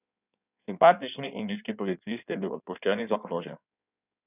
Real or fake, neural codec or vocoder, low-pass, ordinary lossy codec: fake; codec, 16 kHz in and 24 kHz out, 1.1 kbps, FireRedTTS-2 codec; 3.6 kHz; none